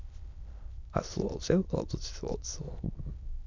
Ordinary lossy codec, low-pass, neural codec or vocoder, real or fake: MP3, 64 kbps; 7.2 kHz; autoencoder, 22.05 kHz, a latent of 192 numbers a frame, VITS, trained on many speakers; fake